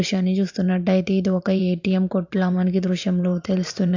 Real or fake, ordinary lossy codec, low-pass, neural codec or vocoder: fake; AAC, 48 kbps; 7.2 kHz; vocoder, 44.1 kHz, 128 mel bands every 512 samples, BigVGAN v2